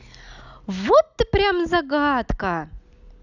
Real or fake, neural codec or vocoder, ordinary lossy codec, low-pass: real; none; none; 7.2 kHz